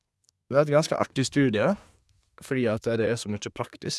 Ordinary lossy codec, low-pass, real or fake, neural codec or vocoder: none; none; fake; codec, 24 kHz, 1 kbps, SNAC